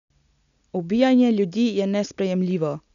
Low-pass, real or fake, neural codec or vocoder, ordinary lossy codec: 7.2 kHz; real; none; none